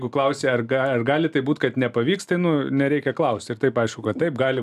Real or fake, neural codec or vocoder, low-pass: real; none; 14.4 kHz